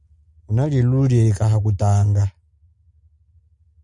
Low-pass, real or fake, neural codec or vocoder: 10.8 kHz; real; none